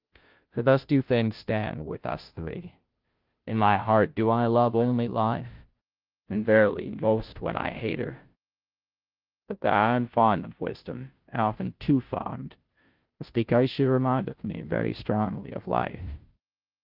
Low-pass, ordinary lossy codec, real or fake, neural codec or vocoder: 5.4 kHz; Opus, 32 kbps; fake; codec, 16 kHz, 0.5 kbps, FunCodec, trained on Chinese and English, 25 frames a second